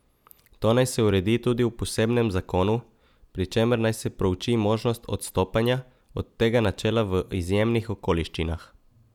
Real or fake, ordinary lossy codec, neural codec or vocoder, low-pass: real; none; none; 19.8 kHz